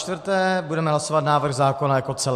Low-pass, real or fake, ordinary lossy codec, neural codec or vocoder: 14.4 kHz; real; MP3, 64 kbps; none